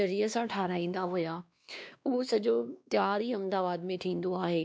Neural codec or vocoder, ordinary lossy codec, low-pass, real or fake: codec, 16 kHz, 2 kbps, X-Codec, WavLM features, trained on Multilingual LibriSpeech; none; none; fake